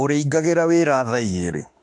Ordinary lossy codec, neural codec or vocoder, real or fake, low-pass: AAC, 64 kbps; autoencoder, 48 kHz, 32 numbers a frame, DAC-VAE, trained on Japanese speech; fake; 10.8 kHz